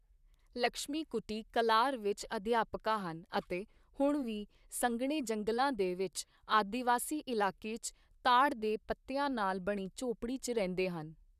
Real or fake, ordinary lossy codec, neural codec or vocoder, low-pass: fake; none; vocoder, 44.1 kHz, 128 mel bands, Pupu-Vocoder; 14.4 kHz